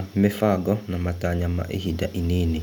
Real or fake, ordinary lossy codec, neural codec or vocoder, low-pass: real; none; none; none